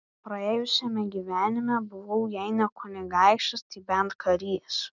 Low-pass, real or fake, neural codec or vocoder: 7.2 kHz; real; none